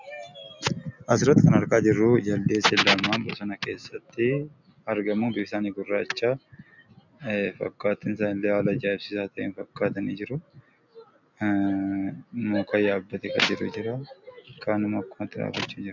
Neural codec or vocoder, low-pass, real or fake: none; 7.2 kHz; real